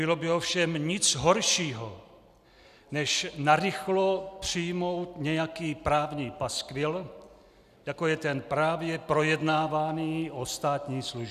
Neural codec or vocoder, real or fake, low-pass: none; real; 14.4 kHz